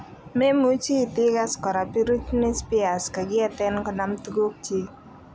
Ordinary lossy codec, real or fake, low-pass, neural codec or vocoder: none; real; none; none